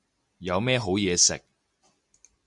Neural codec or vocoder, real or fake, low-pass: none; real; 10.8 kHz